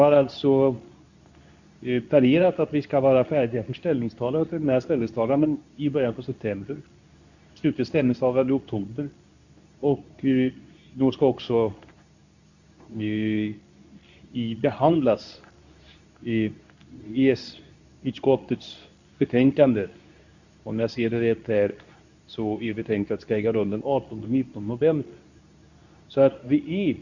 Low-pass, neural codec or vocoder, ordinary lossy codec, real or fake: 7.2 kHz; codec, 24 kHz, 0.9 kbps, WavTokenizer, medium speech release version 2; none; fake